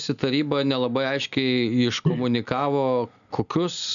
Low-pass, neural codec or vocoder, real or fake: 7.2 kHz; none; real